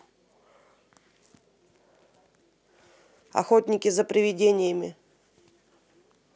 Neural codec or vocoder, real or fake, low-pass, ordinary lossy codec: none; real; none; none